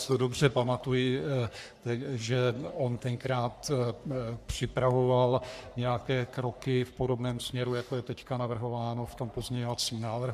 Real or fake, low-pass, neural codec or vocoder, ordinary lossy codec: fake; 14.4 kHz; codec, 44.1 kHz, 3.4 kbps, Pupu-Codec; AAC, 96 kbps